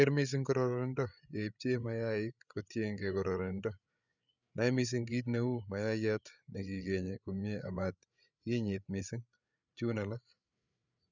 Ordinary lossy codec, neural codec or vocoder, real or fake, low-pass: none; codec, 16 kHz, 8 kbps, FreqCodec, larger model; fake; 7.2 kHz